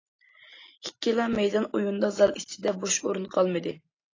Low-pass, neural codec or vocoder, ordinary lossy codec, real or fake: 7.2 kHz; none; AAC, 32 kbps; real